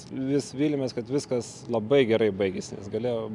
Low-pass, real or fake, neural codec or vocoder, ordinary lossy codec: 10.8 kHz; real; none; MP3, 96 kbps